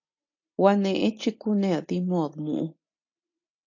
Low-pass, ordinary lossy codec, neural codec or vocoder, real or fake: 7.2 kHz; AAC, 48 kbps; none; real